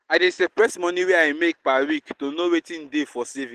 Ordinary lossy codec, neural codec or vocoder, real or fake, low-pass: Opus, 16 kbps; none; real; 14.4 kHz